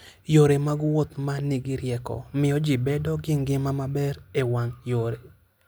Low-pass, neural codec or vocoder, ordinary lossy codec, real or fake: none; none; none; real